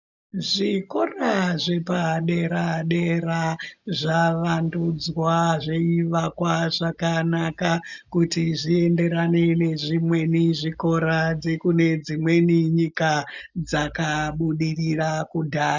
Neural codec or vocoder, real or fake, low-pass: none; real; 7.2 kHz